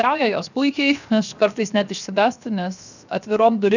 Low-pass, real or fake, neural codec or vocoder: 7.2 kHz; fake; codec, 16 kHz, 0.7 kbps, FocalCodec